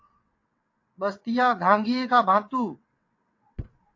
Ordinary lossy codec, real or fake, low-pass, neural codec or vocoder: AAC, 48 kbps; fake; 7.2 kHz; vocoder, 22.05 kHz, 80 mel bands, WaveNeXt